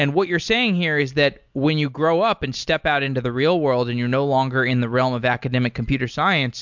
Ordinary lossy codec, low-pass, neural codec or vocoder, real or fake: MP3, 64 kbps; 7.2 kHz; none; real